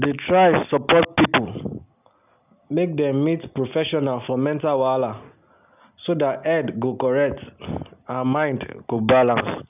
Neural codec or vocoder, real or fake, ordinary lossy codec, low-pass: none; real; none; 3.6 kHz